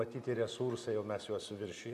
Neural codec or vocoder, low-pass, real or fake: none; 14.4 kHz; real